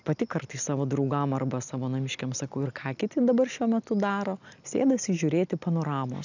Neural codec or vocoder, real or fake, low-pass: none; real; 7.2 kHz